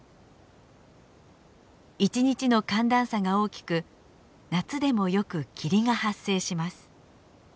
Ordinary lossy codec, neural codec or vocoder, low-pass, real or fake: none; none; none; real